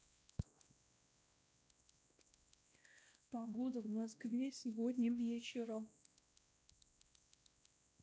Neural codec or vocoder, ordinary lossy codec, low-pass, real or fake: codec, 16 kHz, 1 kbps, X-Codec, WavLM features, trained on Multilingual LibriSpeech; none; none; fake